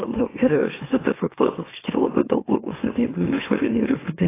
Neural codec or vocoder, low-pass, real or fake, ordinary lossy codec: autoencoder, 44.1 kHz, a latent of 192 numbers a frame, MeloTTS; 3.6 kHz; fake; AAC, 16 kbps